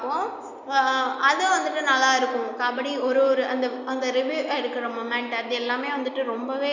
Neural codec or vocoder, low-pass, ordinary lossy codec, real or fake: none; 7.2 kHz; none; real